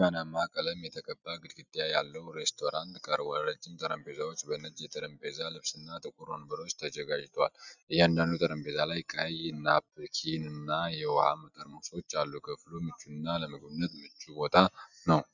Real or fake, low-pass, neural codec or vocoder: real; 7.2 kHz; none